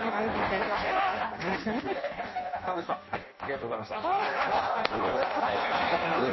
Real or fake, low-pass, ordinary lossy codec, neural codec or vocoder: fake; 7.2 kHz; MP3, 24 kbps; codec, 16 kHz in and 24 kHz out, 0.6 kbps, FireRedTTS-2 codec